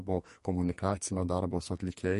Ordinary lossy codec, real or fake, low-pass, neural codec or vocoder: MP3, 48 kbps; fake; 14.4 kHz; codec, 44.1 kHz, 2.6 kbps, SNAC